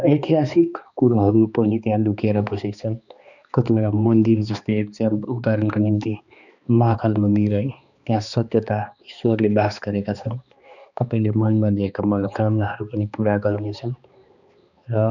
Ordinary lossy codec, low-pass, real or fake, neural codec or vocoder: none; 7.2 kHz; fake; codec, 16 kHz, 2 kbps, X-Codec, HuBERT features, trained on balanced general audio